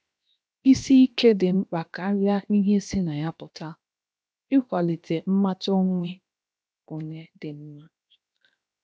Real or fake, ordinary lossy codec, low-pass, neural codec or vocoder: fake; none; none; codec, 16 kHz, 0.7 kbps, FocalCodec